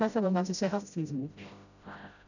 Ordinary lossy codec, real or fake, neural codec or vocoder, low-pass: none; fake; codec, 16 kHz, 0.5 kbps, FreqCodec, smaller model; 7.2 kHz